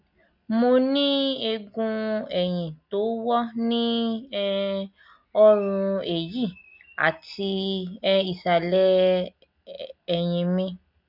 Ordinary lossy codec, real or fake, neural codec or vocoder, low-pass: none; real; none; 5.4 kHz